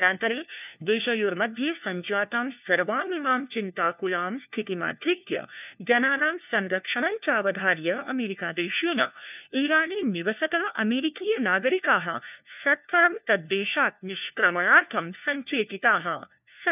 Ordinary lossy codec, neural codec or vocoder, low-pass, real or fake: none; codec, 16 kHz, 1 kbps, FunCodec, trained on LibriTTS, 50 frames a second; 3.6 kHz; fake